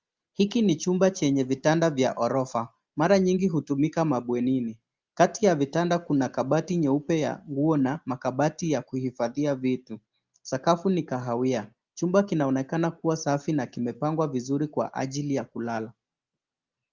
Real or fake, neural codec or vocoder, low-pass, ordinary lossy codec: real; none; 7.2 kHz; Opus, 32 kbps